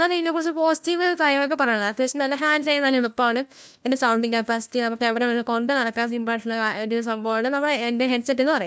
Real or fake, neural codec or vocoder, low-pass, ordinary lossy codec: fake; codec, 16 kHz, 1 kbps, FunCodec, trained on LibriTTS, 50 frames a second; none; none